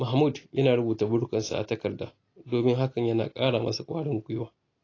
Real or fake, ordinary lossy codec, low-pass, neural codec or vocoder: real; AAC, 32 kbps; 7.2 kHz; none